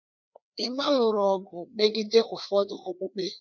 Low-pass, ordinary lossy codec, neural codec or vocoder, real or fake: 7.2 kHz; none; codec, 16 kHz, 2 kbps, FreqCodec, larger model; fake